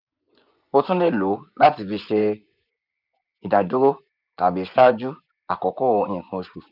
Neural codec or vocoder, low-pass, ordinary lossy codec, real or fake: vocoder, 24 kHz, 100 mel bands, Vocos; 5.4 kHz; none; fake